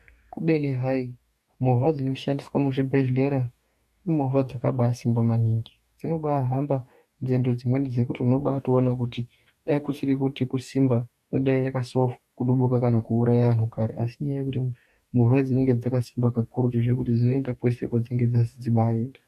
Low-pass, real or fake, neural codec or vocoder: 14.4 kHz; fake; codec, 44.1 kHz, 2.6 kbps, DAC